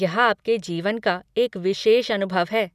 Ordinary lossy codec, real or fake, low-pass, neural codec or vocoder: none; real; 14.4 kHz; none